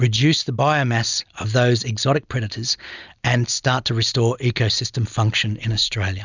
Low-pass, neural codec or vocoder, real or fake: 7.2 kHz; none; real